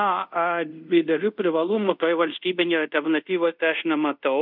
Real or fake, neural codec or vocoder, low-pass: fake; codec, 24 kHz, 0.5 kbps, DualCodec; 5.4 kHz